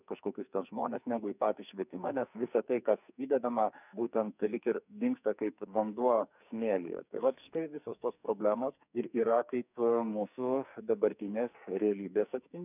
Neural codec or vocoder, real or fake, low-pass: codec, 44.1 kHz, 2.6 kbps, SNAC; fake; 3.6 kHz